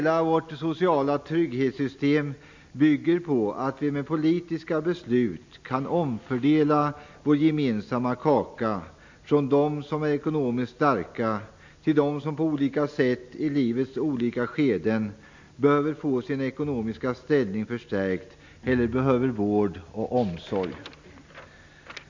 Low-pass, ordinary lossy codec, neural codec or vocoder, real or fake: 7.2 kHz; MP3, 64 kbps; none; real